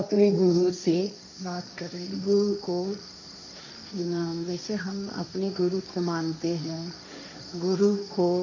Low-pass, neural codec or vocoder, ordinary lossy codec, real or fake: 7.2 kHz; codec, 16 kHz, 1.1 kbps, Voila-Tokenizer; none; fake